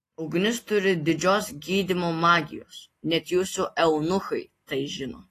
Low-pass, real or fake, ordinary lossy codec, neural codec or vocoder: 14.4 kHz; real; AAC, 48 kbps; none